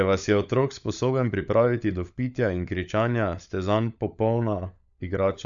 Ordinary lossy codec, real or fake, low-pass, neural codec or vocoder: none; fake; 7.2 kHz; codec, 16 kHz, 16 kbps, FunCodec, trained on LibriTTS, 50 frames a second